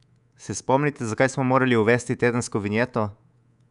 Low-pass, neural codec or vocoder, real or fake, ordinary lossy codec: 10.8 kHz; codec, 24 kHz, 3.1 kbps, DualCodec; fake; none